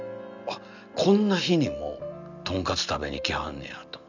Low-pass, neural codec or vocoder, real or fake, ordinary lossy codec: 7.2 kHz; none; real; none